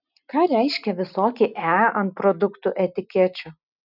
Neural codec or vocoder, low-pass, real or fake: none; 5.4 kHz; real